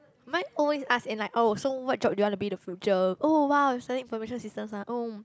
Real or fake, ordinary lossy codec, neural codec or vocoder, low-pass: real; none; none; none